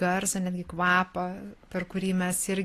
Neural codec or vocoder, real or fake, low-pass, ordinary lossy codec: none; real; 14.4 kHz; AAC, 48 kbps